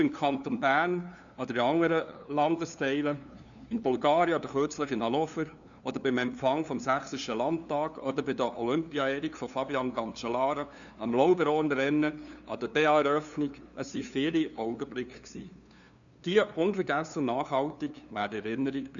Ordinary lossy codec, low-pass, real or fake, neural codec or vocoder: none; 7.2 kHz; fake; codec, 16 kHz, 2 kbps, FunCodec, trained on LibriTTS, 25 frames a second